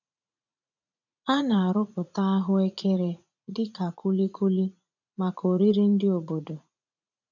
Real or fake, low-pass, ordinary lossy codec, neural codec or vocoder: fake; 7.2 kHz; none; vocoder, 44.1 kHz, 80 mel bands, Vocos